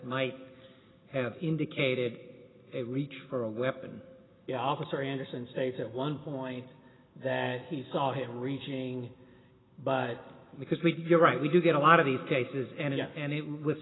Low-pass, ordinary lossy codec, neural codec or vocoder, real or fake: 7.2 kHz; AAC, 16 kbps; none; real